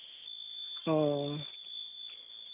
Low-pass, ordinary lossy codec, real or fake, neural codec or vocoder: 3.6 kHz; none; real; none